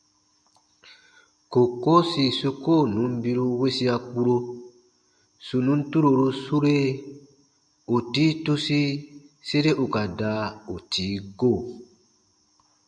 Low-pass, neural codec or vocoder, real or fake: 9.9 kHz; none; real